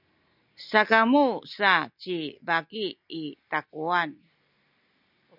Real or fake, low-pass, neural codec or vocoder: real; 5.4 kHz; none